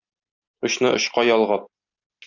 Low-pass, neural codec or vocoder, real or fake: 7.2 kHz; none; real